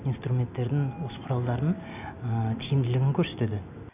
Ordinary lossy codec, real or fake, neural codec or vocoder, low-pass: none; real; none; 3.6 kHz